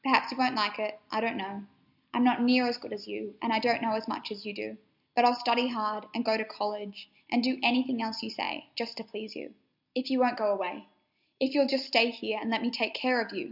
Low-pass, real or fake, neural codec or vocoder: 5.4 kHz; real; none